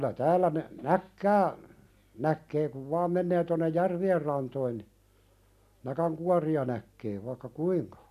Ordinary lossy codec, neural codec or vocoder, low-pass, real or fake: none; none; 14.4 kHz; real